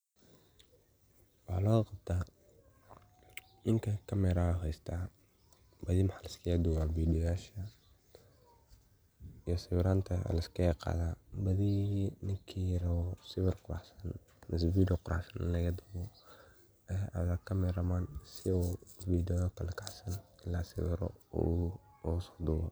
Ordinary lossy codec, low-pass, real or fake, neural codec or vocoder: none; none; real; none